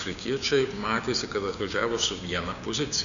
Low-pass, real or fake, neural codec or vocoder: 7.2 kHz; fake; codec, 16 kHz, 6 kbps, DAC